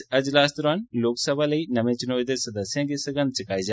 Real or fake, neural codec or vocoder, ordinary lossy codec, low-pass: real; none; none; none